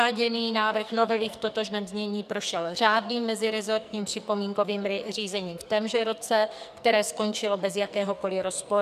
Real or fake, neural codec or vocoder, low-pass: fake; codec, 44.1 kHz, 2.6 kbps, SNAC; 14.4 kHz